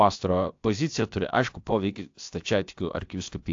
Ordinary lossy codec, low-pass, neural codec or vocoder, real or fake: AAC, 48 kbps; 7.2 kHz; codec, 16 kHz, about 1 kbps, DyCAST, with the encoder's durations; fake